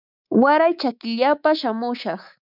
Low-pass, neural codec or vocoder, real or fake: 5.4 kHz; codec, 24 kHz, 3.1 kbps, DualCodec; fake